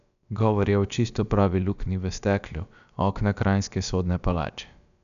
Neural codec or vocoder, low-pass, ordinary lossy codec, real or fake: codec, 16 kHz, about 1 kbps, DyCAST, with the encoder's durations; 7.2 kHz; none; fake